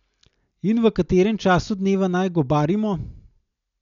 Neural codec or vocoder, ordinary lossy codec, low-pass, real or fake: none; none; 7.2 kHz; real